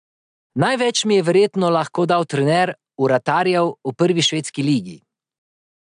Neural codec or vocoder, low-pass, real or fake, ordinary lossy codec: none; 9.9 kHz; real; AAC, 96 kbps